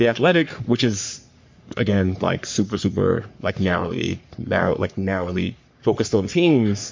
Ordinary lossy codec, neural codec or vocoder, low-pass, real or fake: MP3, 48 kbps; codec, 44.1 kHz, 3.4 kbps, Pupu-Codec; 7.2 kHz; fake